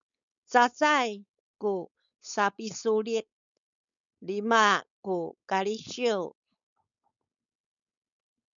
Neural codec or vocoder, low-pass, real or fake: codec, 16 kHz, 4.8 kbps, FACodec; 7.2 kHz; fake